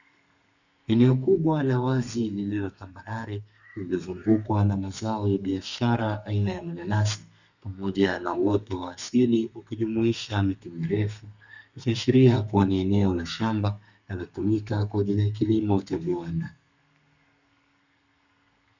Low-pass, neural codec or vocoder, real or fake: 7.2 kHz; codec, 32 kHz, 1.9 kbps, SNAC; fake